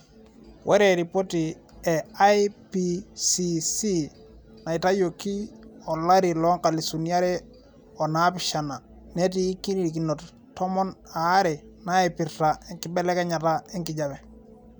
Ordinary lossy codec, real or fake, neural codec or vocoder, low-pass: none; real; none; none